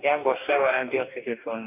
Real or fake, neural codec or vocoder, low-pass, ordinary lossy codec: fake; codec, 44.1 kHz, 2.6 kbps, DAC; 3.6 kHz; none